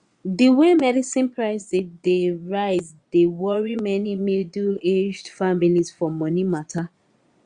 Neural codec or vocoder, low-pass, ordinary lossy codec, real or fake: vocoder, 22.05 kHz, 80 mel bands, Vocos; 9.9 kHz; Opus, 64 kbps; fake